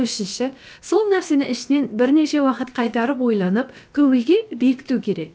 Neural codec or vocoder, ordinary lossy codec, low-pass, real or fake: codec, 16 kHz, about 1 kbps, DyCAST, with the encoder's durations; none; none; fake